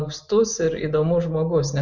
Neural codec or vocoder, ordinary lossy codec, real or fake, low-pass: none; MP3, 48 kbps; real; 7.2 kHz